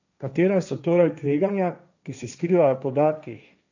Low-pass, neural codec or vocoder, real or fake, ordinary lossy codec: 7.2 kHz; codec, 16 kHz, 1.1 kbps, Voila-Tokenizer; fake; none